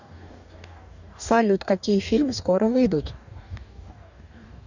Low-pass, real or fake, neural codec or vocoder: 7.2 kHz; fake; codec, 44.1 kHz, 2.6 kbps, DAC